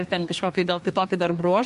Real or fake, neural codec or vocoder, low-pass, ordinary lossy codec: fake; codec, 24 kHz, 1 kbps, SNAC; 10.8 kHz; MP3, 64 kbps